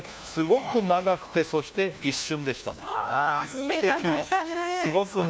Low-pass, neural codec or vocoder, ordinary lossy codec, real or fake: none; codec, 16 kHz, 1 kbps, FunCodec, trained on LibriTTS, 50 frames a second; none; fake